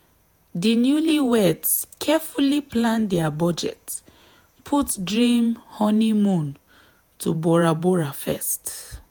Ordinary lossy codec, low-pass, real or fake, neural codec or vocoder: none; none; fake; vocoder, 48 kHz, 128 mel bands, Vocos